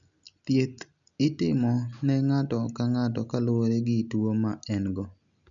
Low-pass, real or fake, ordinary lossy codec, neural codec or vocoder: 7.2 kHz; real; none; none